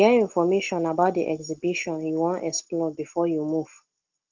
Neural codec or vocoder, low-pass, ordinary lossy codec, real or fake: none; 7.2 kHz; Opus, 16 kbps; real